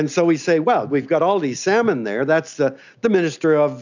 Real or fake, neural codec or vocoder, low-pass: real; none; 7.2 kHz